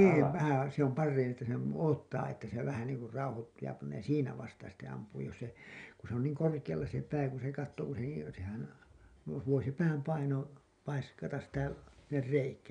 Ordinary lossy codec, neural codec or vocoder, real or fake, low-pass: none; none; real; 9.9 kHz